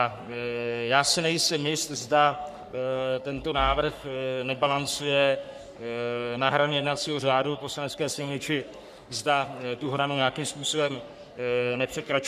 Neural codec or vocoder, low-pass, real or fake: codec, 44.1 kHz, 3.4 kbps, Pupu-Codec; 14.4 kHz; fake